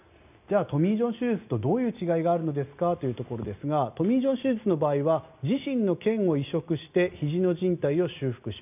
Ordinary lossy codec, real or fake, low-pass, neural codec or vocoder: none; real; 3.6 kHz; none